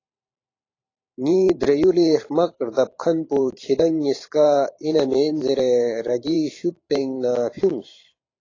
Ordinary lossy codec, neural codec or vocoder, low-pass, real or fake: AAC, 32 kbps; vocoder, 44.1 kHz, 128 mel bands every 512 samples, BigVGAN v2; 7.2 kHz; fake